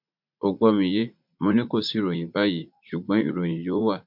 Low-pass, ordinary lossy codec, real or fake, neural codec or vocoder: 5.4 kHz; MP3, 48 kbps; fake; vocoder, 44.1 kHz, 80 mel bands, Vocos